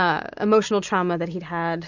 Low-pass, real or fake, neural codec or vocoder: 7.2 kHz; real; none